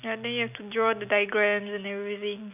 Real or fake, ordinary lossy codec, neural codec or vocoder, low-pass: real; none; none; 3.6 kHz